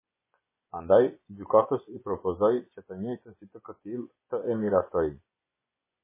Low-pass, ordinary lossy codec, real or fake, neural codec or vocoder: 3.6 kHz; MP3, 16 kbps; fake; vocoder, 24 kHz, 100 mel bands, Vocos